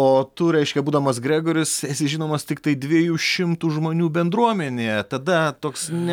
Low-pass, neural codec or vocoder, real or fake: 19.8 kHz; none; real